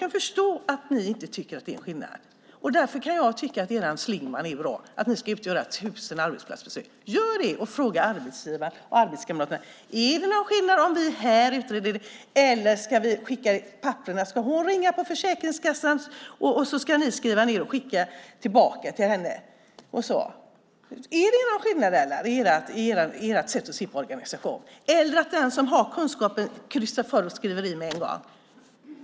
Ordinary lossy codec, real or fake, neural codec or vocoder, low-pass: none; real; none; none